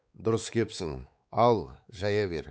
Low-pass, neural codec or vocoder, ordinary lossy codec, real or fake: none; codec, 16 kHz, 4 kbps, X-Codec, WavLM features, trained on Multilingual LibriSpeech; none; fake